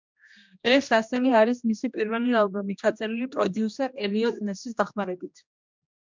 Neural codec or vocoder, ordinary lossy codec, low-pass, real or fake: codec, 16 kHz, 1 kbps, X-Codec, HuBERT features, trained on general audio; MP3, 64 kbps; 7.2 kHz; fake